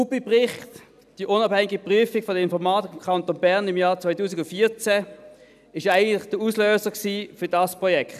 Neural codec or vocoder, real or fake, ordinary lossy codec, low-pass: none; real; none; 14.4 kHz